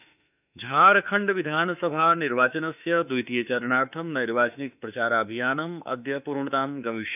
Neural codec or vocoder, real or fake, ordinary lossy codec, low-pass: autoencoder, 48 kHz, 32 numbers a frame, DAC-VAE, trained on Japanese speech; fake; none; 3.6 kHz